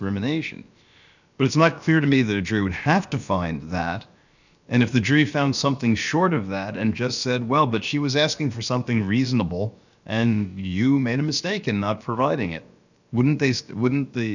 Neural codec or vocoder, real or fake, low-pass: codec, 16 kHz, about 1 kbps, DyCAST, with the encoder's durations; fake; 7.2 kHz